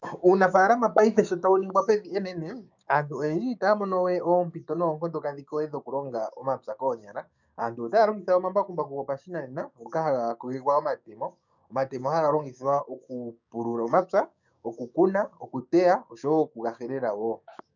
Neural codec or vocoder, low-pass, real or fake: codec, 16 kHz, 6 kbps, DAC; 7.2 kHz; fake